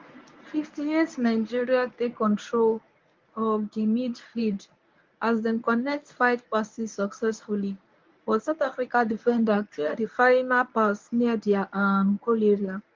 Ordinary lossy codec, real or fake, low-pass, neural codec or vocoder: Opus, 32 kbps; fake; 7.2 kHz; codec, 24 kHz, 0.9 kbps, WavTokenizer, medium speech release version 1